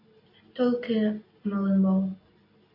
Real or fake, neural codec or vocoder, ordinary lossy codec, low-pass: real; none; AAC, 24 kbps; 5.4 kHz